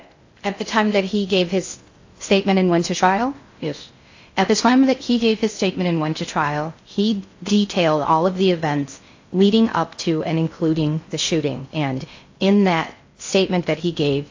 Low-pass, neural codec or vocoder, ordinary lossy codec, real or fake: 7.2 kHz; codec, 16 kHz in and 24 kHz out, 0.6 kbps, FocalCodec, streaming, 2048 codes; AAC, 48 kbps; fake